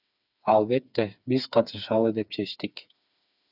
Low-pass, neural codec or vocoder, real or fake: 5.4 kHz; codec, 16 kHz, 4 kbps, FreqCodec, smaller model; fake